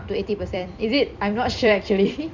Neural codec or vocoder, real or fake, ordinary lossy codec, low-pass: vocoder, 44.1 kHz, 128 mel bands every 256 samples, BigVGAN v2; fake; MP3, 64 kbps; 7.2 kHz